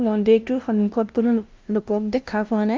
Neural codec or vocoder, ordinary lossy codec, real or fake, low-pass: codec, 16 kHz, 0.5 kbps, FunCodec, trained on LibriTTS, 25 frames a second; Opus, 24 kbps; fake; 7.2 kHz